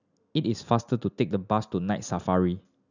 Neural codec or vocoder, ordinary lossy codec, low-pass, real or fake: none; none; 7.2 kHz; real